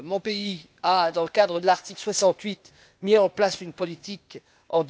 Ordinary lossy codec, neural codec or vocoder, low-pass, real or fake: none; codec, 16 kHz, 0.8 kbps, ZipCodec; none; fake